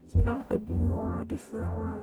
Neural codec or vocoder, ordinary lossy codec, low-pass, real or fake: codec, 44.1 kHz, 0.9 kbps, DAC; none; none; fake